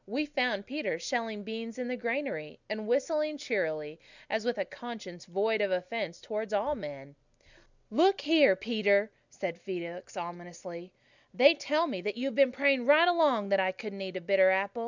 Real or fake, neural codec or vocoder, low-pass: real; none; 7.2 kHz